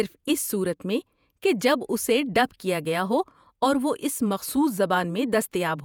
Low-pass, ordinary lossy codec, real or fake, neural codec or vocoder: none; none; fake; vocoder, 48 kHz, 128 mel bands, Vocos